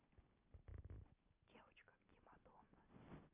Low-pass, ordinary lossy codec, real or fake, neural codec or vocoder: 3.6 kHz; none; real; none